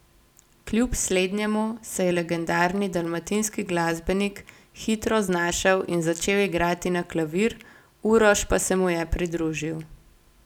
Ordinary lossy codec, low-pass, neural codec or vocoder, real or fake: none; 19.8 kHz; none; real